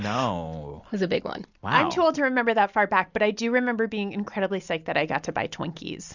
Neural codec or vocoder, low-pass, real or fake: none; 7.2 kHz; real